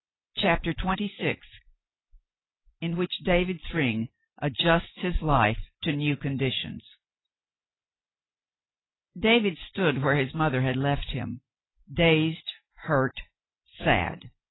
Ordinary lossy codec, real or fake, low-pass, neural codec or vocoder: AAC, 16 kbps; real; 7.2 kHz; none